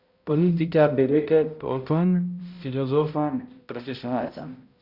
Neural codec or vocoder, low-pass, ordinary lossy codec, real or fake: codec, 16 kHz, 0.5 kbps, X-Codec, HuBERT features, trained on balanced general audio; 5.4 kHz; Opus, 64 kbps; fake